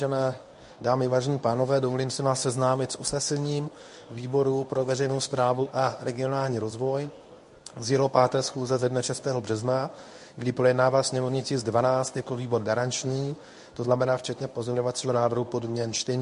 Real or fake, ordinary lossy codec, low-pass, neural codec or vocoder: fake; MP3, 48 kbps; 10.8 kHz; codec, 24 kHz, 0.9 kbps, WavTokenizer, medium speech release version 1